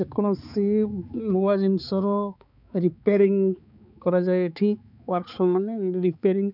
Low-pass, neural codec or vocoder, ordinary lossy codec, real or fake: 5.4 kHz; codec, 16 kHz, 4 kbps, X-Codec, HuBERT features, trained on balanced general audio; none; fake